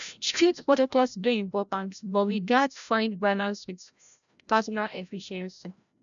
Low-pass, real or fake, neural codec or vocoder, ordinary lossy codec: 7.2 kHz; fake; codec, 16 kHz, 0.5 kbps, FreqCodec, larger model; none